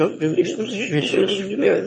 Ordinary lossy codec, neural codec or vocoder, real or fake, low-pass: MP3, 32 kbps; autoencoder, 22.05 kHz, a latent of 192 numbers a frame, VITS, trained on one speaker; fake; 9.9 kHz